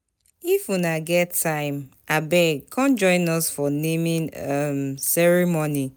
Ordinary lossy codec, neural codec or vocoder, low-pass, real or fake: none; none; none; real